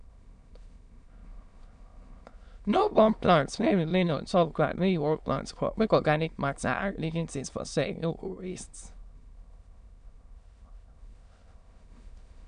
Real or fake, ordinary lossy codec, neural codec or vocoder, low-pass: fake; none; autoencoder, 22.05 kHz, a latent of 192 numbers a frame, VITS, trained on many speakers; 9.9 kHz